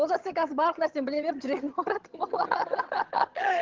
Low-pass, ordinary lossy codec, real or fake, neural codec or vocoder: 7.2 kHz; Opus, 16 kbps; fake; vocoder, 22.05 kHz, 80 mel bands, HiFi-GAN